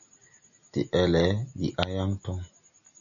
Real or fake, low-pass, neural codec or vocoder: real; 7.2 kHz; none